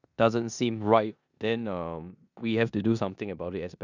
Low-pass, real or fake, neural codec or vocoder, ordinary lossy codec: 7.2 kHz; fake; codec, 16 kHz in and 24 kHz out, 0.9 kbps, LongCat-Audio-Codec, four codebook decoder; none